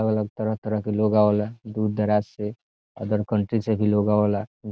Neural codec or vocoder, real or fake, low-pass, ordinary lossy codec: none; real; none; none